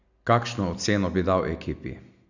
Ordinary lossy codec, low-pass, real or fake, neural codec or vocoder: none; 7.2 kHz; real; none